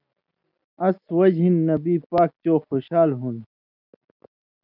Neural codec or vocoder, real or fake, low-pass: none; real; 5.4 kHz